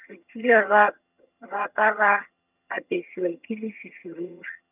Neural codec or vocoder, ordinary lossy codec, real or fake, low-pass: vocoder, 22.05 kHz, 80 mel bands, HiFi-GAN; none; fake; 3.6 kHz